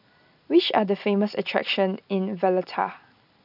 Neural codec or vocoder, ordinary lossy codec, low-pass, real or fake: none; none; 5.4 kHz; real